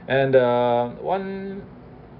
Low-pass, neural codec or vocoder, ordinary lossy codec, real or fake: 5.4 kHz; none; none; real